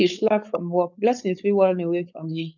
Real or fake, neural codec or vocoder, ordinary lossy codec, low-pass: fake; codec, 16 kHz, 4.8 kbps, FACodec; none; 7.2 kHz